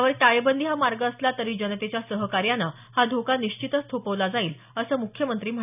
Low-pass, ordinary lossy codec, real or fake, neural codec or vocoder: 3.6 kHz; none; real; none